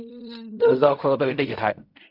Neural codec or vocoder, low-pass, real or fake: codec, 16 kHz in and 24 kHz out, 0.4 kbps, LongCat-Audio-Codec, fine tuned four codebook decoder; 5.4 kHz; fake